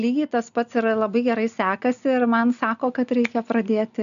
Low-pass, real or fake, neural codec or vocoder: 7.2 kHz; real; none